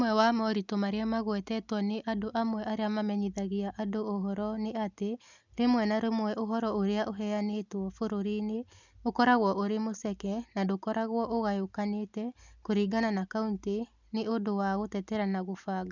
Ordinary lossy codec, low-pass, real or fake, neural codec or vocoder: none; 7.2 kHz; real; none